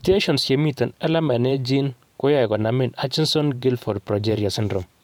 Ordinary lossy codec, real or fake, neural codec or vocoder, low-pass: none; fake; vocoder, 44.1 kHz, 128 mel bands every 512 samples, BigVGAN v2; 19.8 kHz